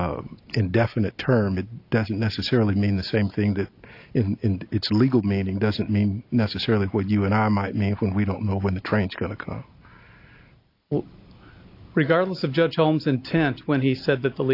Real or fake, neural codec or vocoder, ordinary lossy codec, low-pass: real; none; AAC, 32 kbps; 5.4 kHz